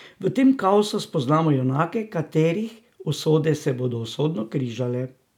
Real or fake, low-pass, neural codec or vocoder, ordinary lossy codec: real; 19.8 kHz; none; none